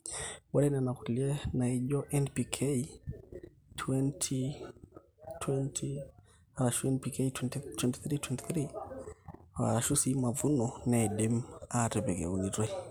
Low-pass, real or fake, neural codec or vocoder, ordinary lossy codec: none; real; none; none